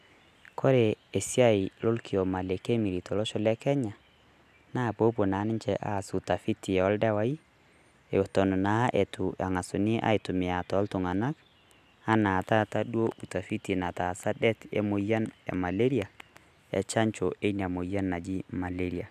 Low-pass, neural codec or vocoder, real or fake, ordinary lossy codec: 14.4 kHz; none; real; none